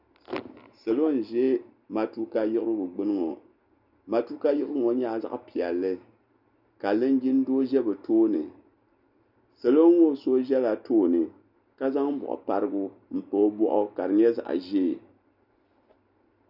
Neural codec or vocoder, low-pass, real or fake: none; 5.4 kHz; real